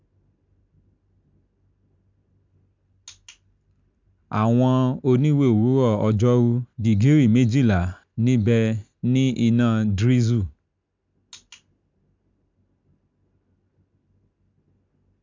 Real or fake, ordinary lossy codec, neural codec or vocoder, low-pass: real; MP3, 64 kbps; none; 7.2 kHz